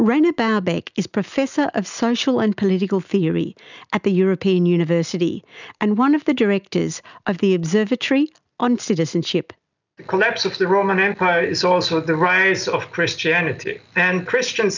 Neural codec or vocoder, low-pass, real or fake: none; 7.2 kHz; real